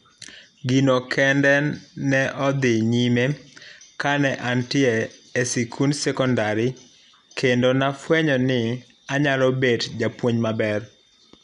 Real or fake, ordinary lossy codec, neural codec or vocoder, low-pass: real; none; none; 10.8 kHz